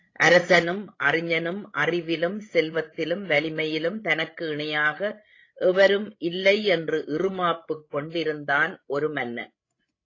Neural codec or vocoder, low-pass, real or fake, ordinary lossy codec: codec, 16 kHz, 16 kbps, FreqCodec, larger model; 7.2 kHz; fake; AAC, 32 kbps